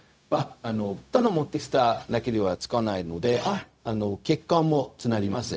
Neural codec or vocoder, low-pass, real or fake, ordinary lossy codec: codec, 16 kHz, 0.4 kbps, LongCat-Audio-Codec; none; fake; none